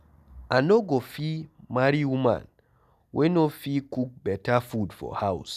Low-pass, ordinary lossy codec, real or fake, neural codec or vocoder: 14.4 kHz; none; real; none